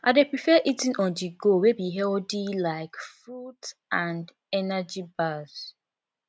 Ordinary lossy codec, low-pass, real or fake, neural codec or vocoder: none; none; real; none